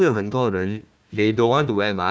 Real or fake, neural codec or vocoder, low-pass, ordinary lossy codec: fake; codec, 16 kHz, 1 kbps, FunCodec, trained on Chinese and English, 50 frames a second; none; none